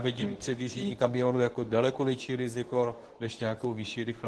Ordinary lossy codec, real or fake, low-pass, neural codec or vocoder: Opus, 16 kbps; fake; 10.8 kHz; codec, 24 kHz, 0.9 kbps, WavTokenizer, medium speech release version 2